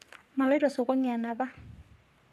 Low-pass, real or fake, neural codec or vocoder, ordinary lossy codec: 14.4 kHz; fake; codec, 44.1 kHz, 3.4 kbps, Pupu-Codec; none